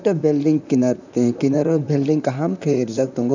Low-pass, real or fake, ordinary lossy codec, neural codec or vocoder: 7.2 kHz; fake; none; vocoder, 44.1 kHz, 128 mel bands, Pupu-Vocoder